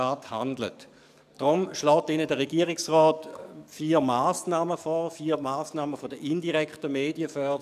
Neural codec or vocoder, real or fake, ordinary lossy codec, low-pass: codec, 44.1 kHz, 7.8 kbps, DAC; fake; none; 14.4 kHz